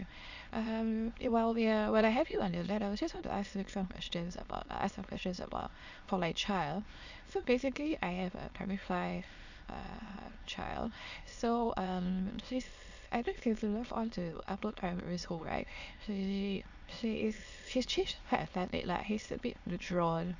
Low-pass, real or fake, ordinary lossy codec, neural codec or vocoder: 7.2 kHz; fake; Opus, 64 kbps; autoencoder, 22.05 kHz, a latent of 192 numbers a frame, VITS, trained on many speakers